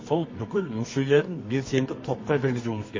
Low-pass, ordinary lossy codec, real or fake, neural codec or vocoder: 7.2 kHz; MP3, 32 kbps; fake; codec, 24 kHz, 0.9 kbps, WavTokenizer, medium music audio release